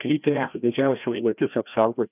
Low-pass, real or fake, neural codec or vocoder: 3.6 kHz; fake; codec, 16 kHz, 1 kbps, FreqCodec, larger model